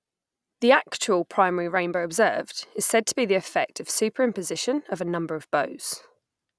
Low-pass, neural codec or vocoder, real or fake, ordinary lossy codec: none; none; real; none